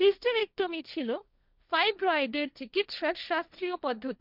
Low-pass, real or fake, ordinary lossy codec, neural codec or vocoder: 5.4 kHz; fake; none; codec, 16 kHz, 1.1 kbps, Voila-Tokenizer